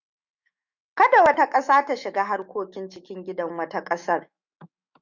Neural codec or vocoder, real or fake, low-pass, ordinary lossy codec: autoencoder, 48 kHz, 128 numbers a frame, DAC-VAE, trained on Japanese speech; fake; 7.2 kHz; Opus, 64 kbps